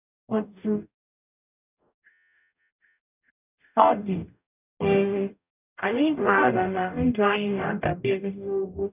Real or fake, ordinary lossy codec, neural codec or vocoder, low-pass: fake; none; codec, 44.1 kHz, 0.9 kbps, DAC; 3.6 kHz